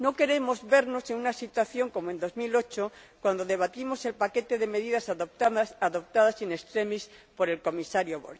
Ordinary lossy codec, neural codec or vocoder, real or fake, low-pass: none; none; real; none